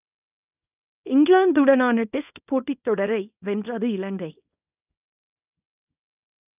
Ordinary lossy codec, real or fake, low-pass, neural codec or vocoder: none; fake; 3.6 kHz; codec, 24 kHz, 0.9 kbps, WavTokenizer, small release